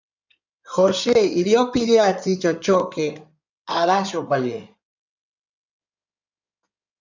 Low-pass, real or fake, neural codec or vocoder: 7.2 kHz; fake; codec, 16 kHz in and 24 kHz out, 2.2 kbps, FireRedTTS-2 codec